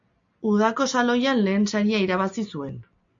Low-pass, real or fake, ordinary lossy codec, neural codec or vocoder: 7.2 kHz; real; AAC, 64 kbps; none